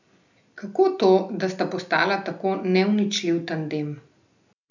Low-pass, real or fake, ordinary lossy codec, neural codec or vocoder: 7.2 kHz; real; none; none